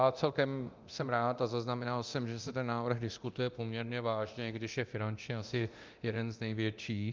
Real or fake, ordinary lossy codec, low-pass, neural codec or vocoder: fake; Opus, 32 kbps; 7.2 kHz; codec, 24 kHz, 0.9 kbps, DualCodec